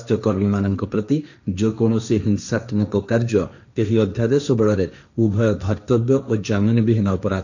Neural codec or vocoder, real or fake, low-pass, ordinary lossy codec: codec, 16 kHz, 1.1 kbps, Voila-Tokenizer; fake; 7.2 kHz; none